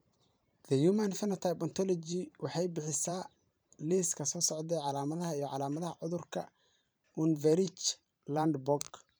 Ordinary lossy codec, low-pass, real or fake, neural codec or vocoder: none; none; fake; vocoder, 44.1 kHz, 128 mel bands every 512 samples, BigVGAN v2